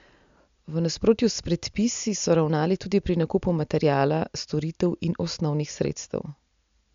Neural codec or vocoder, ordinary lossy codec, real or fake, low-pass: none; MP3, 64 kbps; real; 7.2 kHz